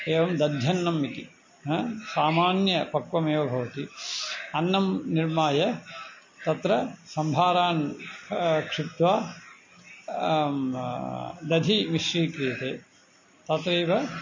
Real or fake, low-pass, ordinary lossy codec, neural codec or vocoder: real; 7.2 kHz; MP3, 32 kbps; none